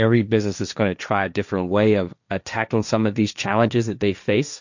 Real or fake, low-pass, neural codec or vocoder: fake; 7.2 kHz; codec, 16 kHz, 1.1 kbps, Voila-Tokenizer